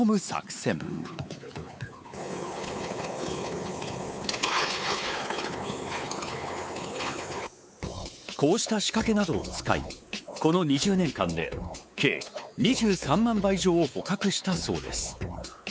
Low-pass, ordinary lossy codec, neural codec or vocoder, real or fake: none; none; codec, 16 kHz, 4 kbps, X-Codec, WavLM features, trained on Multilingual LibriSpeech; fake